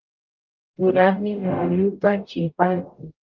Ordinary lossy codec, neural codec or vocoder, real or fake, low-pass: Opus, 32 kbps; codec, 44.1 kHz, 0.9 kbps, DAC; fake; 7.2 kHz